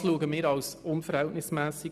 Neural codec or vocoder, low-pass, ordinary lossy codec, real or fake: none; 14.4 kHz; none; real